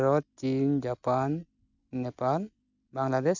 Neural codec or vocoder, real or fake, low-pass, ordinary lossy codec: none; real; 7.2 kHz; none